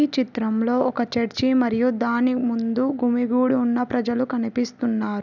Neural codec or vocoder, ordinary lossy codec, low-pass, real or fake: none; none; 7.2 kHz; real